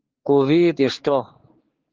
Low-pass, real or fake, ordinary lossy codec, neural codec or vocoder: 7.2 kHz; fake; Opus, 16 kbps; codec, 16 kHz, 4 kbps, X-Codec, HuBERT features, trained on general audio